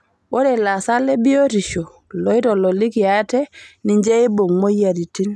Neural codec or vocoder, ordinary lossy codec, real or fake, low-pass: none; none; real; none